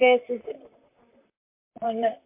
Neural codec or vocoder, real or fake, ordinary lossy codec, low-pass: vocoder, 44.1 kHz, 128 mel bands, Pupu-Vocoder; fake; MP3, 24 kbps; 3.6 kHz